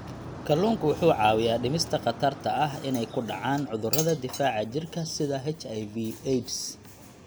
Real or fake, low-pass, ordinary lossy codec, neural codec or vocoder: real; none; none; none